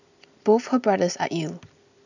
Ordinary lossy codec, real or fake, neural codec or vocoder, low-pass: none; real; none; 7.2 kHz